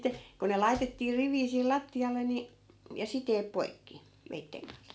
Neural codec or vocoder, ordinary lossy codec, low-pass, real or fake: none; none; none; real